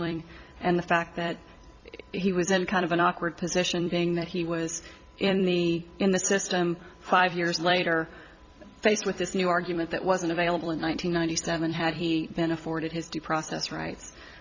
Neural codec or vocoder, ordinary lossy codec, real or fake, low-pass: vocoder, 44.1 kHz, 128 mel bands every 256 samples, BigVGAN v2; Opus, 64 kbps; fake; 7.2 kHz